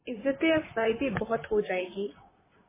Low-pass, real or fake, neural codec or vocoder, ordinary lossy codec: 3.6 kHz; fake; vocoder, 44.1 kHz, 128 mel bands, Pupu-Vocoder; MP3, 16 kbps